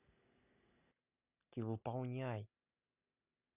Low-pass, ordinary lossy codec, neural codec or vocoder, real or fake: 3.6 kHz; none; none; real